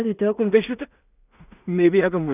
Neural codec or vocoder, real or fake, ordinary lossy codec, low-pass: codec, 16 kHz in and 24 kHz out, 0.4 kbps, LongCat-Audio-Codec, two codebook decoder; fake; none; 3.6 kHz